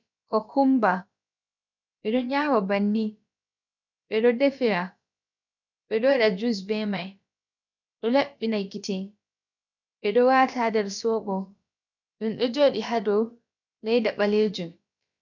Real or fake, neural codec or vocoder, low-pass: fake; codec, 16 kHz, about 1 kbps, DyCAST, with the encoder's durations; 7.2 kHz